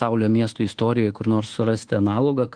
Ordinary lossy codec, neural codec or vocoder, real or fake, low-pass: Opus, 16 kbps; codec, 24 kHz, 1.2 kbps, DualCodec; fake; 9.9 kHz